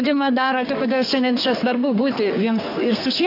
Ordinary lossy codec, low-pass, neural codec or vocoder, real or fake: AAC, 32 kbps; 5.4 kHz; codec, 32 kHz, 1.9 kbps, SNAC; fake